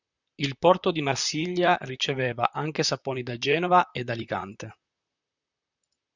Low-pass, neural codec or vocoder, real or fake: 7.2 kHz; vocoder, 44.1 kHz, 128 mel bands, Pupu-Vocoder; fake